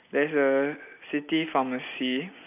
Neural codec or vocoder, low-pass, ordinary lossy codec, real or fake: none; 3.6 kHz; none; real